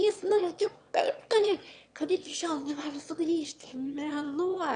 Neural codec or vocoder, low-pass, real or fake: autoencoder, 22.05 kHz, a latent of 192 numbers a frame, VITS, trained on one speaker; 9.9 kHz; fake